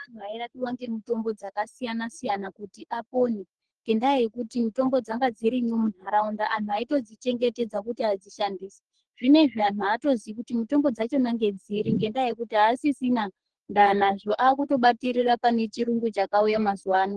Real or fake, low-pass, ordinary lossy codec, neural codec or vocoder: fake; 10.8 kHz; Opus, 16 kbps; codec, 44.1 kHz, 3.4 kbps, Pupu-Codec